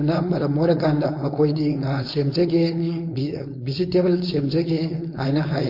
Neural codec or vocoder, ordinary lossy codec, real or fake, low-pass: codec, 16 kHz, 4.8 kbps, FACodec; MP3, 32 kbps; fake; 5.4 kHz